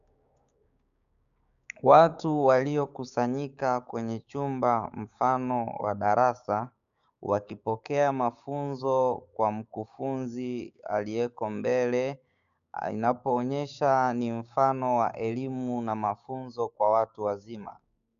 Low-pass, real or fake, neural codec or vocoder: 7.2 kHz; fake; codec, 16 kHz, 6 kbps, DAC